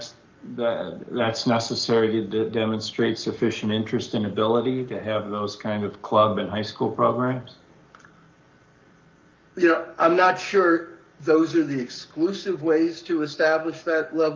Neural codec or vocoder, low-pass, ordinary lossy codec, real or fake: codec, 44.1 kHz, 7.8 kbps, Pupu-Codec; 7.2 kHz; Opus, 24 kbps; fake